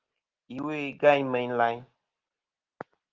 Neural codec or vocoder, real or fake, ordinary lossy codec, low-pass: none; real; Opus, 16 kbps; 7.2 kHz